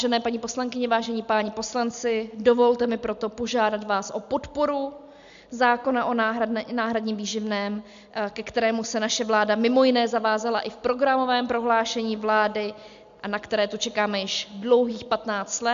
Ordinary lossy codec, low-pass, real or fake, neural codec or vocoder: MP3, 64 kbps; 7.2 kHz; real; none